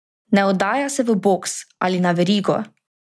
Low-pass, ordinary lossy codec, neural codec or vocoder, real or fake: none; none; none; real